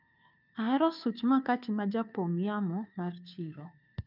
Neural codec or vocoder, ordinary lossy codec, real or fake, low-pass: codec, 16 kHz, 2 kbps, FunCodec, trained on Chinese and English, 25 frames a second; none; fake; 5.4 kHz